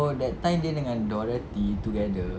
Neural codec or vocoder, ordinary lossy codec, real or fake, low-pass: none; none; real; none